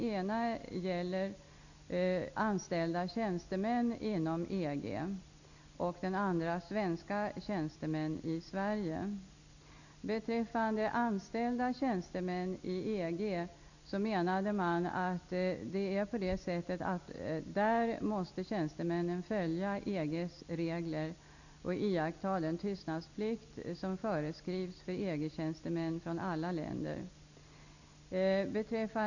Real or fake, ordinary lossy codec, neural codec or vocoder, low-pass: real; none; none; 7.2 kHz